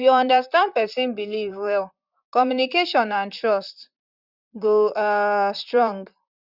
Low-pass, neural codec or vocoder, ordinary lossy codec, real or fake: 5.4 kHz; vocoder, 44.1 kHz, 128 mel bands, Pupu-Vocoder; Opus, 64 kbps; fake